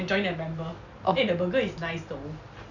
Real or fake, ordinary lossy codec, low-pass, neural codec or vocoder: real; none; 7.2 kHz; none